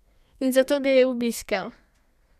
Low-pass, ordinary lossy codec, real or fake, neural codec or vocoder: 14.4 kHz; none; fake; codec, 32 kHz, 1.9 kbps, SNAC